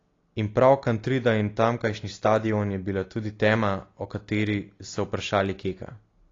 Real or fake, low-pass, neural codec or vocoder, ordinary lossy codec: real; 7.2 kHz; none; AAC, 32 kbps